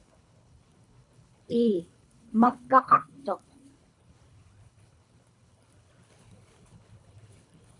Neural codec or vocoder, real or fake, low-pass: codec, 24 kHz, 3 kbps, HILCodec; fake; 10.8 kHz